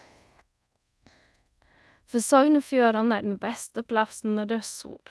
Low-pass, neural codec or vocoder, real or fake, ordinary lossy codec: none; codec, 24 kHz, 0.5 kbps, DualCodec; fake; none